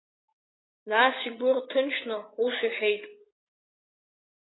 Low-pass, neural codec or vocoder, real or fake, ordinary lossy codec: 7.2 kHz; none; real; AAC, 16 kbps